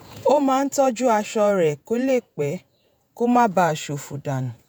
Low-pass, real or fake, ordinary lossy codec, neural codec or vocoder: none; fake; none; vocoder, 48 kHz, 128 mel bands, Vocos